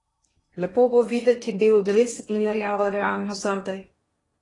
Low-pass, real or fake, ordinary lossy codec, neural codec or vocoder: 10.8 kHz; fake; AAC, 32 kbps; codec, 16 kHz in and 24 kHz out, 0.8 kbps, FocalCodec, streaming, 65536 codes